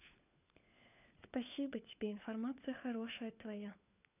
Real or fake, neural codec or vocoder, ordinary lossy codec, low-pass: fake; codec, 16 kHz, 4 kbps, FunCodec, trained on LibriTTS, 50 frames a second; none; 3.6 kHz